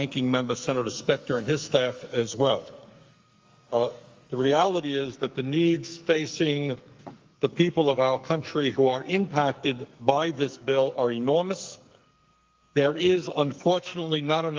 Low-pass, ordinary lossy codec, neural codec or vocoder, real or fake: 7.2 kHz; Opus, 32 kbps; codec, 44.1 kHz, 2.6 kbps, SNAC; fake